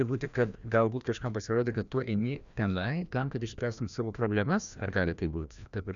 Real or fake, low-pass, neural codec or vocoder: fake; 7.2 kHz; codec, 16 kHz, 1 kbps, FreqCodec, larger model